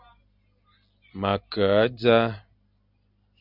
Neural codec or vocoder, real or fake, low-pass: none; real; 5.4 kHz